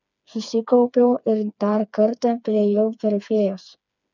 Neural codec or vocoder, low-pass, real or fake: codec, 16 kHz, 4 kbps, FreqCodec, smaller model; 7.2 kHz; fake